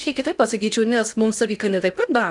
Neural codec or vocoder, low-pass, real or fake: codec, 16 kHz in and 24 kHz out, 0.8 kbps, FocalCodec, streaming, 65536 codes; 10.8 kHz; fake